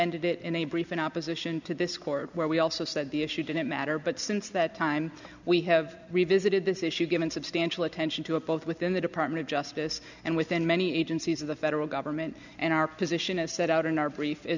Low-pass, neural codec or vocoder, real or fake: 7.2 kHz; none; real